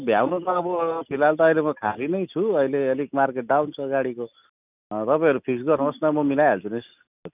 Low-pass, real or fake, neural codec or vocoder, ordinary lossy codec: 3.6 kHz; real; none; none